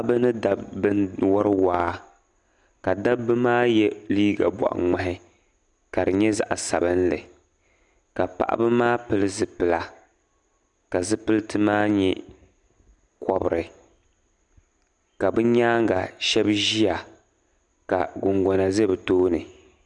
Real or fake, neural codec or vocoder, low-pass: real; none; 10.8 kHz